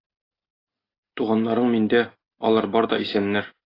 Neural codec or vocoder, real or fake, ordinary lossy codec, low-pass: none; real; AAC, 24 kbps; 5.4 kHz